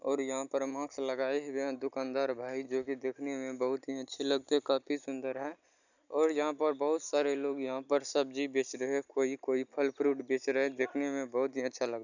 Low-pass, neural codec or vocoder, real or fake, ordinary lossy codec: 7.2 kHz; none; real; none